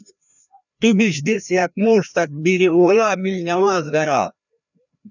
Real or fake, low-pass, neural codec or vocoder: fake; 7.2 kHz; codec, 16 kHz, 1 kbps, FreqCodec, larger model